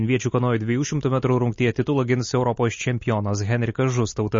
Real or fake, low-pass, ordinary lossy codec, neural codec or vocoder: real; 7.2 kHz; MP3, 32 kbps; none